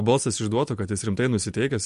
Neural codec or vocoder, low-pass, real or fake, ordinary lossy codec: none; 14.4 kHz; real; MP3, 48 kbps